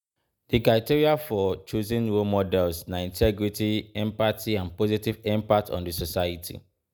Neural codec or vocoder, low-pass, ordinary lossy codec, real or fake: none; none; none; real